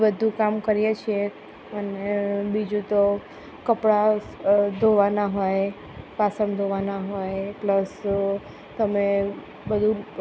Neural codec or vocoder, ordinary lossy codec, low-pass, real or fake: none; none; none; real